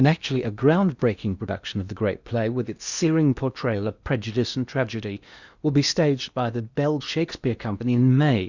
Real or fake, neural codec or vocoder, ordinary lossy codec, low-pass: fake; codec, 16 kHz in and 24 kHz out, 0.8 kbps, FocalCodec, streaming, 65536 codes; Opus, 64 kbps; 7.2 kHz